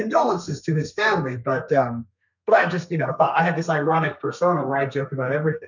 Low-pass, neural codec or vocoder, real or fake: 7.2 kHz; codec, 32 kHz, 1.9 kbps, SNAC; fake